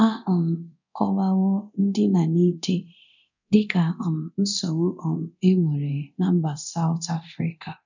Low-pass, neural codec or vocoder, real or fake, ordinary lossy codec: 7.2 kHz; codec, 24 kHz, 0.9 kbps, DualCodec; fake; none